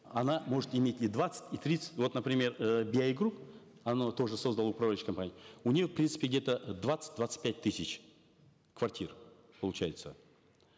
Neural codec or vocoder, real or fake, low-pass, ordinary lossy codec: none; real; none; none